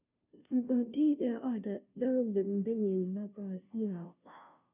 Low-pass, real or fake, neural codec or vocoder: 3.6 kHz; fake; codec, 16 kHz, 0.5 kbps, FunCodec, trained on Chinese and English, 25 frames a second